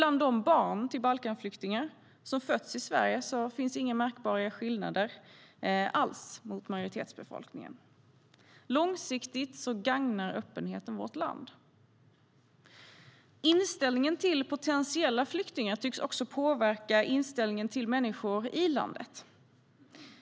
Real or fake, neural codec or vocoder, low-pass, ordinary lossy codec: real; none; none; none